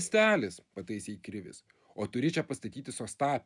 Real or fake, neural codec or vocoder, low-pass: real; none; 10.8 kHz